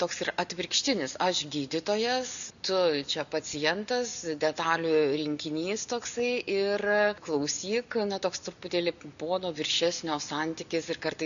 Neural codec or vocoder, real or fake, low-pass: none; real; 7.2 kHz